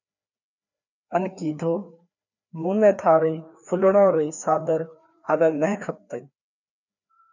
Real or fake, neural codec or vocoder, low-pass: fake; codec, 16 kHz, 2 kbps, FreqCodec, larger model; 7.2 kHz